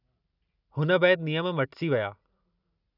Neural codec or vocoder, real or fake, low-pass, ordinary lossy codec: none; real; 5.4 kHz; none